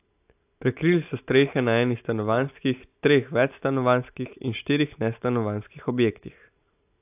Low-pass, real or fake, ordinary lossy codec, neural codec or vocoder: 3.6 kHz; real; AAC, 32 kbps; none